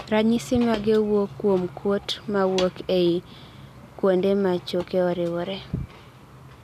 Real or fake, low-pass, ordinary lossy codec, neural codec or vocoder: real; 14.4 kHz; none; none